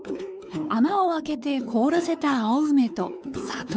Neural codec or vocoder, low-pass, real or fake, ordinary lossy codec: codec, 16 kHz, 4 kbps, X-Codec, WavLM features, trained on Multilingual LibriSpeech; none; fake; none